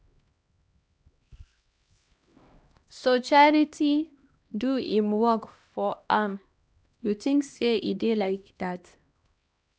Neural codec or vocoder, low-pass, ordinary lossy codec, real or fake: codec, 16 kHz, 1 kbps, X-Codec, HuBERT features, trained on LibriSpeech; none; none; fake